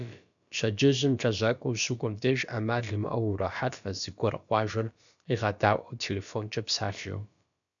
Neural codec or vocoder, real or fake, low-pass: codec, 16 kHz, about 1 kbps, DyCAST, with the encoder's durations; fake; 7.2 kHz